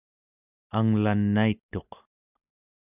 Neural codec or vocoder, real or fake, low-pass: none; real; 3.6 kHz